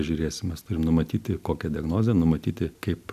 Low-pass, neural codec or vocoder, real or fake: 14.4 kHz; none; real